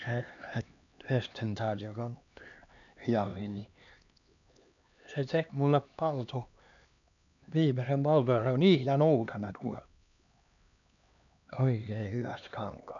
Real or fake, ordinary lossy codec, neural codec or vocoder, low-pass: fake; none; codec, 16 kHz, 2 kbps, X-Codec, HuBERT features, trained on LibriSpeech; 7.2 kHz